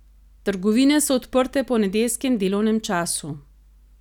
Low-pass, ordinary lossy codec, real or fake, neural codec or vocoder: 19.8 kHz; none; real; none